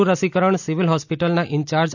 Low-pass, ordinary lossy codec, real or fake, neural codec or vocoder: 7.2 kHz; none; real; none